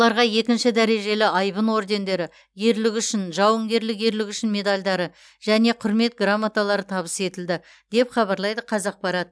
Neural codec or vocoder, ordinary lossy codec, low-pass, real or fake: none; none; none; real